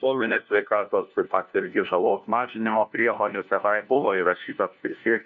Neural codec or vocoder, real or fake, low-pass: codec, 16 kHz, 1 kbps, FunCodec, trained on LibriTTS, 50 frames a second; fake; 7.2 kHz